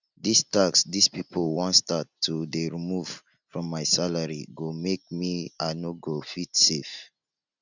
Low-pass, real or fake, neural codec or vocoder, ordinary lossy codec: 7.2 kHz; real; none; none